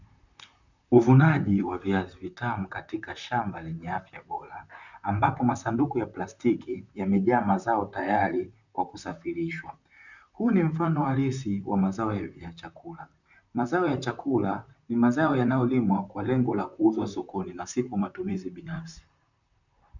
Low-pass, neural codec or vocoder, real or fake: 7.2 kHz; vocoder, 44.1 kHz, 128 mel bands, Pupu-Vocoder; fake